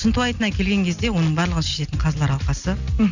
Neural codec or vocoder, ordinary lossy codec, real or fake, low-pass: none; none; real; 7.2 kHz